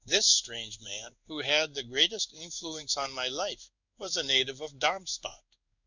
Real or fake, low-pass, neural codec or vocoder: fake; 7.2 kHz; codec, 16 kHz in and 24 kHz out, 1 kbps, XY-Tokenizer